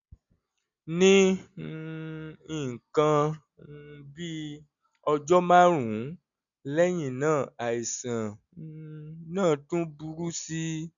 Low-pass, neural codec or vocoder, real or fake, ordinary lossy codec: 7.2 kHz; none; real; none